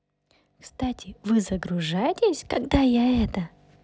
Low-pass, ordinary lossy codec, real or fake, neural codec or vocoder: none; none; real; none